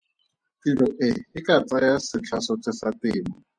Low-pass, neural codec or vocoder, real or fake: 9.9 kHz; vocoder, 24 kHz, 100 mel bands, Vocos; fake